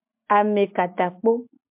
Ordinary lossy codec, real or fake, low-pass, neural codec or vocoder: MP3, 32 kbps; real; 3.6 kHz; none